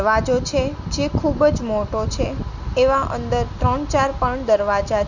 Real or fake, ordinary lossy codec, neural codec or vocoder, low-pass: real; none; none; 7.2 kHz